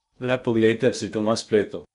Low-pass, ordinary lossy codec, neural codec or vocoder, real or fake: 10.8 kHz; none; codec, 16 kHz in and 24 kHz out, 0.8 kbps, FocalCodec, streaming, 65536 codes; fake